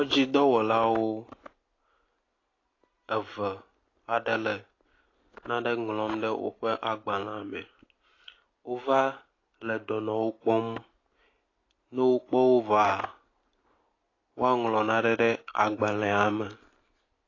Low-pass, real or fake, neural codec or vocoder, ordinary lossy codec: 7.2 kHz; real; none; AAC, 32 kbps